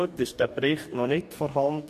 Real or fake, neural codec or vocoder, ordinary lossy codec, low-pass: fake; codec, 44.1 kHz, 2.6 kbps, DAC; MP3, 64 kbps; 14.4 kHz